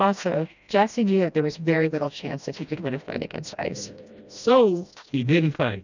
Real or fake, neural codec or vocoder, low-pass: fake; codec, 16 kHz, 1 kbps, FreqCodec, smaller model; 7.2 kHz